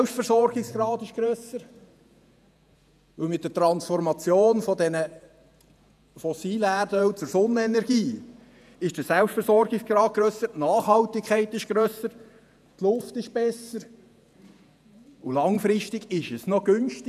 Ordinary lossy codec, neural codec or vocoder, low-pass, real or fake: none; vocoder, 48 kHz, 128 mel bands, Vocos; 14.4 kHz; fake